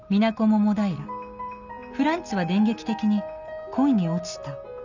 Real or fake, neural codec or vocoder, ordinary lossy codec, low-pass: real; none; none; 7.2 kHz